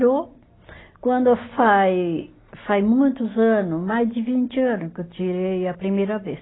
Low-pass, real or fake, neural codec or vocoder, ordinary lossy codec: 7.2 kHz; real; none; AAC, 16 kbps